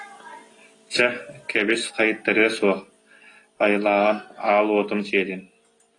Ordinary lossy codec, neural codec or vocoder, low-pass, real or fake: AAC, 32 kbps; none; 10.8 kHz; real